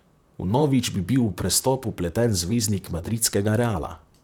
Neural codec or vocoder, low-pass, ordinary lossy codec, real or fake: vocoder, 44.1 kHz, 128 mel bands, Pupu-Vocoder; 19.8 kHz; none; fake